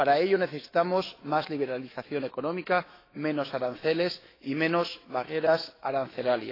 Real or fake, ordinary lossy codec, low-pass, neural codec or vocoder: fake; AAC, 24 kbps; 5.4 kHz; autoencoder, 48 kHz, 128 numbers a frame, DAC-VAE, trained on Japanese speech